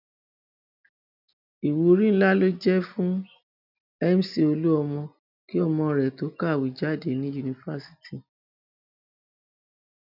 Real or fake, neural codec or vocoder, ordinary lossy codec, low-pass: real; none; none; 5.4 kHz